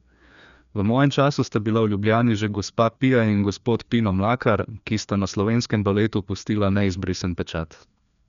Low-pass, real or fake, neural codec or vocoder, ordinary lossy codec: 7.2 kHz; fake; codec, 16 kHz, 2 kbps, FreqCodec, larger model; none